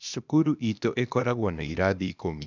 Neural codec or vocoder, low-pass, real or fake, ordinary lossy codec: codec, 16 kHz, 0.8 kbps, ZipCodec; 7.2 kHz; fake; none